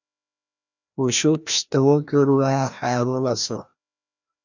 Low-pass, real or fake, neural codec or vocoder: 7.2 kHz; fake; codec, 16 kHz, 1 kbps, FreqCodec, larger model